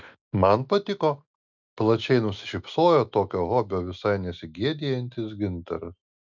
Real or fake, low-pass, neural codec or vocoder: real; 7.2 kHz; none